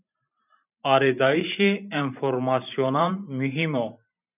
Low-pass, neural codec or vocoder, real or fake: 3.6 kHz; vocoder, 44.1 kHz, 128 mel bands every 512 samples, BigVGAN v2; fake